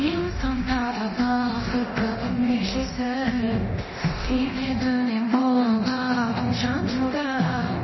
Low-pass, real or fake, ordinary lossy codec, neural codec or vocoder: 7.2 kHz; fake; MP3, 24 kbps; codec, 24 kHz, 0.9 kbps, DualCodec